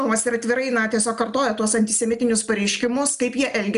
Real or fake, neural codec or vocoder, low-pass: real; none; 10.8 kHz